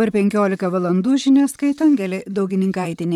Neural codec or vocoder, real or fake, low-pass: vocoder, 44.1 kHz, 128 mel bands, Pupu-Vocoder; fake; 19.8 kHz